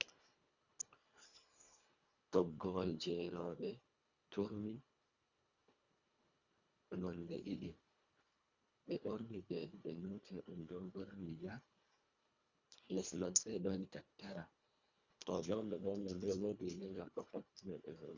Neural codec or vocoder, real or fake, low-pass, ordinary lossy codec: codec, 24 kHz, 1.5 kbps, HILCodec; fake; 7.2 kHz; Opus, 64 kbps